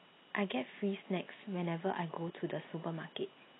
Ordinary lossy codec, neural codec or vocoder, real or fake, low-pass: AAC, 16 kbps; none; real; 7.2 kHz